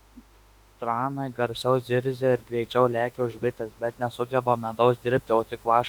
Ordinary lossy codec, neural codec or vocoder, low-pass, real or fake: Opus, 64 kbps; autoencoder, 48 kHz, 32 numbers a frame, DAC-VAE, trained on Japanese speech; 19.8 kHz; fake